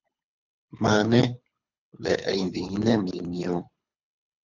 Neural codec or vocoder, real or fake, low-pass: codec, 24 kHz, 3 kbps, HILCodec; fake; 7.2 kHz